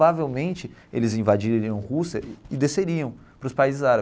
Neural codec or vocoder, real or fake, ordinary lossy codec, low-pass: none; real; none; none